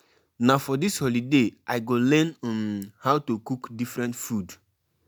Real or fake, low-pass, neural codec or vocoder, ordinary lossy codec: real; none; none; none